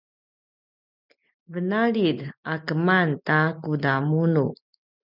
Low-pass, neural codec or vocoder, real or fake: 5.4 kHz; none; real